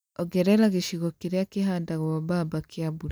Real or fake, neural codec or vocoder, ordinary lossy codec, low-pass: real; none; none; none